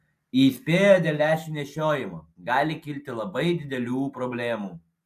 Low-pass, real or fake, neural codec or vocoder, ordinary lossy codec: 14.4 kHz; real; none; AAC, 96 kbps